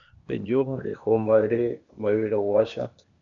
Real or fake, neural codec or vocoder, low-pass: fake; codec, 16 kHz, 0.8 kbps, ZipCodec; 7.2 kHz